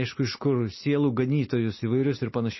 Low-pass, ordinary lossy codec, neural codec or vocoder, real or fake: 7.2 kHz; MP3, 24 kbps; none; real